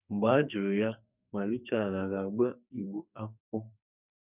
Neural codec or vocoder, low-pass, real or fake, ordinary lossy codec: codec, 44.1 kHz, 2.6 kbps, SNAC; 3.6 kHz; fake; none